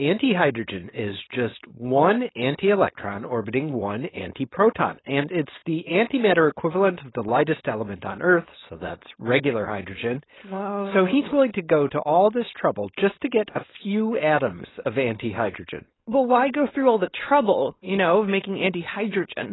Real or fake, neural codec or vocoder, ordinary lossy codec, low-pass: fake; codec, 16 kHz, 4.8 kbps, FACodec; AAC, 16 kbps; 7.2 kHz